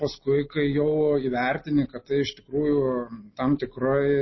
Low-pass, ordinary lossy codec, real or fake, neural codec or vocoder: 7.2 kHz; MP3, 24 kbps; real; none